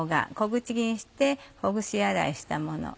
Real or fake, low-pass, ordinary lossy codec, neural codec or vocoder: real; none; none; none